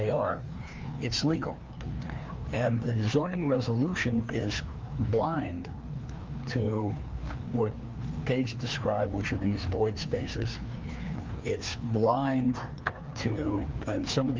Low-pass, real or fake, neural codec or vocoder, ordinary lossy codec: 7.2 kHz; fake; codec, 16 kHz, 2 kbps, FreqCodec, larger model; Opus, 32 kbps